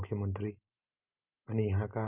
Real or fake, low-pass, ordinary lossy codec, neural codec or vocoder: real; 3.6 kHz; none; none